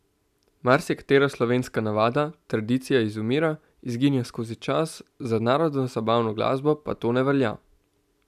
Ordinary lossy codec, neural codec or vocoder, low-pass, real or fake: none; none; 14.4 kHz; real